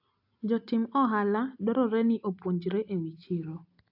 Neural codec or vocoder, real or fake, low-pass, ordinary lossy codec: none; real; 5.4 kHz; AAC, 48 kbps